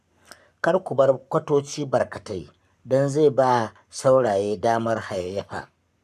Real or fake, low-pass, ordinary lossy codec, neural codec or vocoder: fake; 14.4 kHz; none; codec, 44.1 kHz, 7.8 kbps, Pupu-Codec